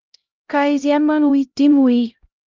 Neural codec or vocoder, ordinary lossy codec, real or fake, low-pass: codec, 16 kHz, 0.5 kbps, X-Codec, HuBERT features, trained on LibriSpeech; Opus, 32 kbps; fake; 7.2 kHz